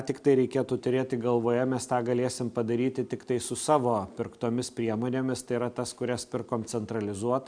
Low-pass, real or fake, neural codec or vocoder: 9.9 kHz; real; none